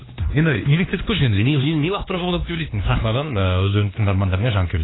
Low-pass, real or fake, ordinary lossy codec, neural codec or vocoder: 7.2 kHz; fake; AAC, 16 kbps; codec, 16 kHz, 2 kbps, X-Codec, HuBERT features, trained on LibriSpeech